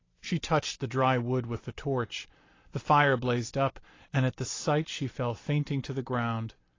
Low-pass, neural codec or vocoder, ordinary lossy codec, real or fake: 7.2 kHz; none; AAC, 32 kbps; real